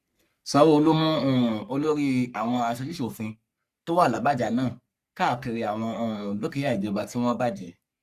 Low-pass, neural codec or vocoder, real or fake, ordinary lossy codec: 14.4 kHz; codec, 44.1 kHz, 3.4 kbps, Pupu-Codec; fake; Opus, 64 kbps